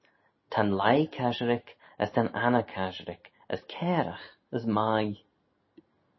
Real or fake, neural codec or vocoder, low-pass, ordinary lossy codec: real; none; 7.2 kHz; MP3, 24 kbps